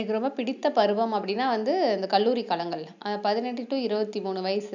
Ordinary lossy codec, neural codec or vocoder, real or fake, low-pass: none; none; real; 7.2 kHz